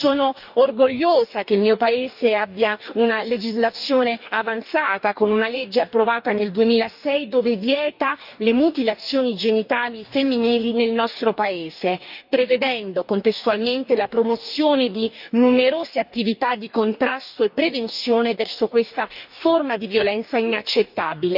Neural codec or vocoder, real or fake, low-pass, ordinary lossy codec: codec, 44.1 kHz, 2.6 kbps, DAC; fake; 5.4 kHz; none